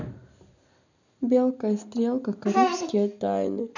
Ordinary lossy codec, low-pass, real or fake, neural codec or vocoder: none; 7.2 kHz; real; none